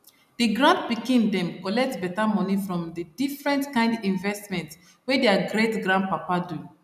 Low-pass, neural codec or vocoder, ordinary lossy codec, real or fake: 14.4 kHz; none; none; real